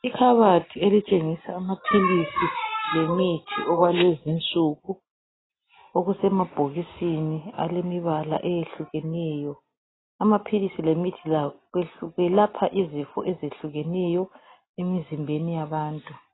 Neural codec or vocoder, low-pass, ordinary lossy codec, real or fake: none; 7.2 kHz; AAC, 16 kbps; real